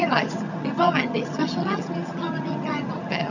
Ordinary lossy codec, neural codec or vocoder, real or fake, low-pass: none; vocoder, 22.05 kHz, 80 mel bands, HiFi-GAN; fake; 7.2 kHz